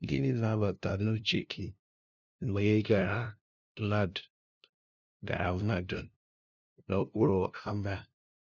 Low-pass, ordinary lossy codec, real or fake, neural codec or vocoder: 7.2 kHz; none; fake; codec, 16 kHz, 0.5 kbps, FunCodec, trained on LibriTTS, 25 frames a second